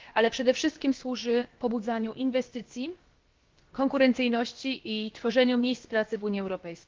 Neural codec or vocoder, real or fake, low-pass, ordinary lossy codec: codec, 16 kHz, about 1 kbps, DyCAST, with the encoder's durations; fake; 7.2 kHz; Opus, 16 kbps